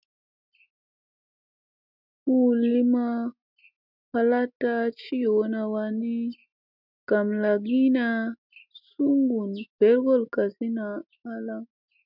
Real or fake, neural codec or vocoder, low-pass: real; none; 5.4 kHz